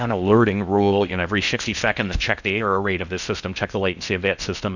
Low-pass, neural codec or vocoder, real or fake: 7.2 kHz; codec, 16 kHz in and 24 kHz out, 0.6 kbps, FocalCodec, streaming, 4096 codes; fake